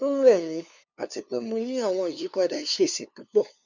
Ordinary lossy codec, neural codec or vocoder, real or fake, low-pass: none; codec, 16 kHz, 2 kbps, FunCodec, trained on LibriTTS, 25 frames a second; fake; 7.2 kHz